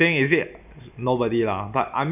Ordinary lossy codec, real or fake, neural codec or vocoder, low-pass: none; real; none; 3.6 kHz